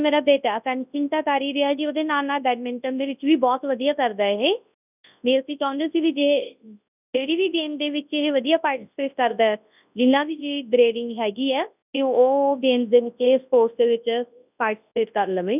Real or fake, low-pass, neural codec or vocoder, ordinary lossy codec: fake; 3.6 kHz; codec, 24 kHz, 0.9 kbps, WavTokenizer, large speech release; none